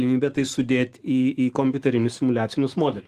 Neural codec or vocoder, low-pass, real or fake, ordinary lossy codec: autoencoder, 48 kHz, 128 numbers a frame, DAC-VAE, trained on Japanese speech; 14.4 kHz; fake; Opus, 24 kbps